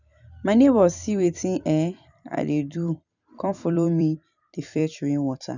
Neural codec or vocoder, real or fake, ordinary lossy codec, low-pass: vocoder, 44.1 kHz, 128 mel bands every 256 samples, BigVGAN v2; fake; none; 7.2 kHz